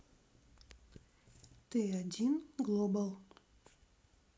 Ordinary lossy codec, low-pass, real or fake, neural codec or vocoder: none; none; real; none